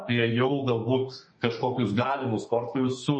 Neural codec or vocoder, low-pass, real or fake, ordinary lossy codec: codec, 32 kHz, 1.9 kbps, SNAC; 9.9 kHz; fake; MP3, 32 kbps